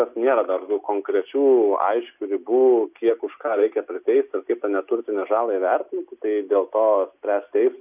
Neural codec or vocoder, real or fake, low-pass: none; real; 3.6 kHz